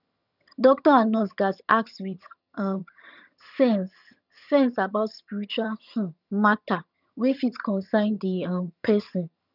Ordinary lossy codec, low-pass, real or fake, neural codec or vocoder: none; 5.4 kHz; fake; vocoder, 22.05 kHz, 80 mel bands, HiFi-GAN